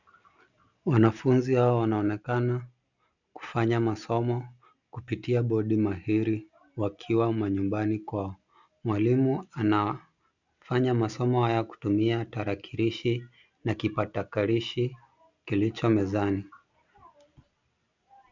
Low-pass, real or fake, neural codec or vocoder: 7.2 kHz; real; none